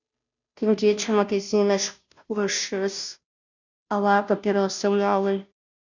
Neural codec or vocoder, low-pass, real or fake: codec, 16 kHz, 0.5 kbps, FunCodec, trained on Chinese and English, 25 frames a second; 7.2 kHz; fake